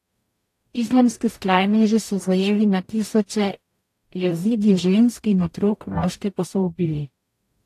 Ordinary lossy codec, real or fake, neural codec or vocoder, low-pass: AAC, 64 kbps; fake; codec, 44.1 kHz, 0.9 kbps, DAC; 14.4 kHz